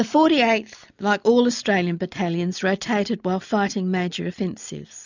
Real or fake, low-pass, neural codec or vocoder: real; 7.2 kHz; none